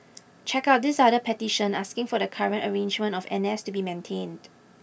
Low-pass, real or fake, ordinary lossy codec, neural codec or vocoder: none; real; none; none